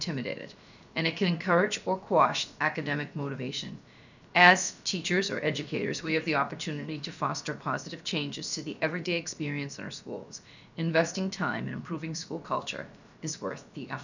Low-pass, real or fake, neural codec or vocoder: 7.2 kHz; fake; codec, 16 kHz, about 1 kbps, DyCAST, with the encoder's durations